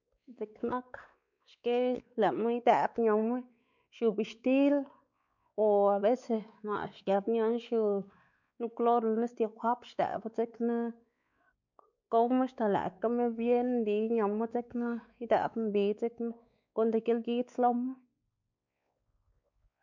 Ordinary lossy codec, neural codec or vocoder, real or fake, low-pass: none; codec, 16 kHz, 4 kbps, X-Codec, WavLM features, trained on Multilingual LibriSpeech; fake; 7.2 kHz